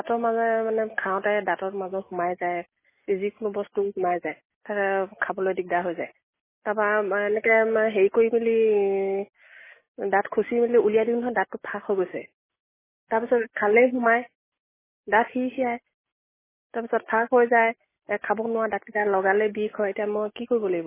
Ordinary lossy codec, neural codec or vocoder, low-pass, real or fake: MP3, 16 kbps; none; 3.6 kHz; real